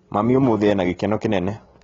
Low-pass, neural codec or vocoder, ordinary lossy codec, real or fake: 7.2 kHz; none; AAC, 24 kbps; real